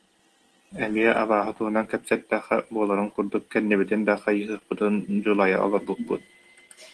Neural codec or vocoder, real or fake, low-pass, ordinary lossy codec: none; real; 10.8 kHz; Opus, 16 kbps